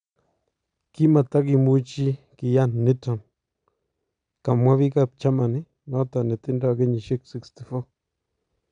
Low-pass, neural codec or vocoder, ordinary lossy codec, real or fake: 9.9 kHz; none; none; real